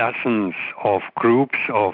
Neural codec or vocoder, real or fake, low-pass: none; real; 5.4 kHz